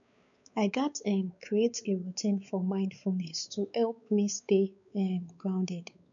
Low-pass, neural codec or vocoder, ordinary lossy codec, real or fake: 7.2 kHz; codec, 16 kHz, 4 kbps, X-Codec, WavLM features, trained on Multilingual LibriSpeech; none; fake